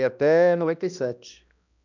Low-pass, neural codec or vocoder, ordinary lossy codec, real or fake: 7.2 kHz; codec, 16 kHz, 1 kbps, X-Codec, HuBERT features, trained on balanced general audio; none; fake